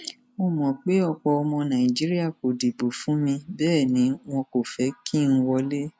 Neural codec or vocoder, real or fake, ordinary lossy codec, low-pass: none; real; none; none